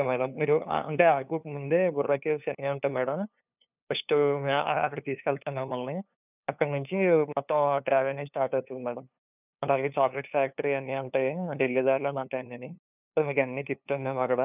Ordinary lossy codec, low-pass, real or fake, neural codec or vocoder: none; 3.6 kHz; fake; codec, 16 kHz, 2 kbps, FunCodec, trained on LibriTTS, 25 frames a second